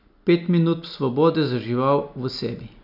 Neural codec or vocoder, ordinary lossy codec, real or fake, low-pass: none; none; real; 5.4 kHz